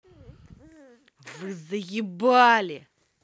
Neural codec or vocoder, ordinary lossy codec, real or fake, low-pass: none; none; real; none